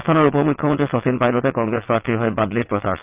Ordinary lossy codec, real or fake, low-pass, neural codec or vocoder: Opus, 24 kbps; fake; 3.6 kHz; vocoder, 22.05 kHz, 80 mel bands, WaveNeXt